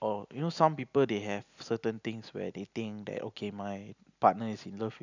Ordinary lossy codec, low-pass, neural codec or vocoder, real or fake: none; 7.2 kHz; none; real